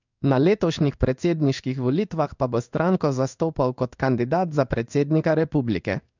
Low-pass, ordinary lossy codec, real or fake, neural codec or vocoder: 7.2 kHz; none; fake; codec, 16 kHz in and 24 kHz out, 1 kbps, XY-Tokenizer